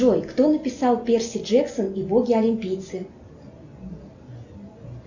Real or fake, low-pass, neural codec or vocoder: real; 7.2 kHz; none